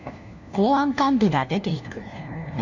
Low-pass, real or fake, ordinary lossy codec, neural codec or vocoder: 7.2 kHz; fake; none; codec, 16 kHz, 1 kbps, FunCodec, trained on LibriTTS, 50 frames a second